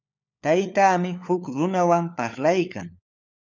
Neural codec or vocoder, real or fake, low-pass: codec, 16 kHz, 4 kbps, FunCodec, trained on LibriTTS, 50 frames a second; fake; 7.2 kHz